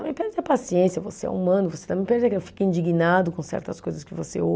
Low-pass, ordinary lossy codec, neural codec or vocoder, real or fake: none; none; none; real